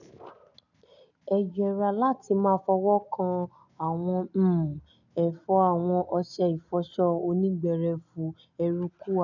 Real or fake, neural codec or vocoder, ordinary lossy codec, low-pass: real; none; none; 7.2 kHz